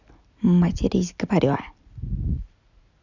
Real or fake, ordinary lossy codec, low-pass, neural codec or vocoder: real; none; 7.2 kHz; none